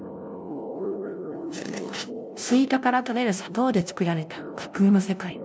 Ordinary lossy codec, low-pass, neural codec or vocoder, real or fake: none; none; codec, 16 kHz, 0.5 kbps, FunCodec, trained on LibriTTS, 25 frames a second; fake